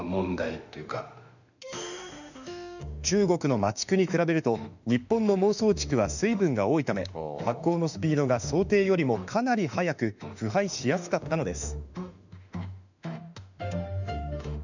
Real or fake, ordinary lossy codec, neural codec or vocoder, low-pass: fake; none; autoencoder, 48 kHz, 32 numbers a frame, DAC-VAE, trained on Japanese speech; 7.2 kHz